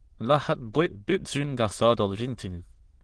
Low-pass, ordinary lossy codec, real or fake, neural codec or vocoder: 9.9 kHz; Opus, 16 kbps; fake; autoencoder, 22.05 kHz, a latent of 192 numbers a frame, VITS, trained on many speakers